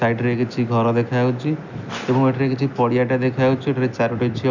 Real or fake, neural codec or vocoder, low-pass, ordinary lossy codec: real; none; 7.2 kHz; none